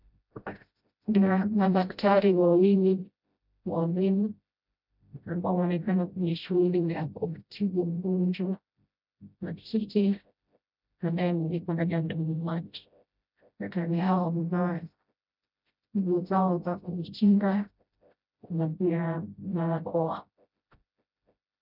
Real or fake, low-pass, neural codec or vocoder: fake; 5.4 kHz; codec, 16 kHz, 0.5 kbps, FreqCodec, smaller model